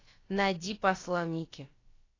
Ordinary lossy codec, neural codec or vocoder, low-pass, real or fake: AAC, 32 kbps; codec, 16 kHz, about 1 kbps, DyCAST, with the encoder's durations; 7.2 kHz; fake